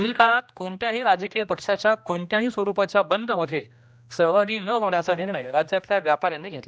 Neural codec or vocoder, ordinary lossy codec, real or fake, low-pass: codec, 16 kHz, 1 kbps, X-Codec, HuBERT features, trained on general audio; none; fake; none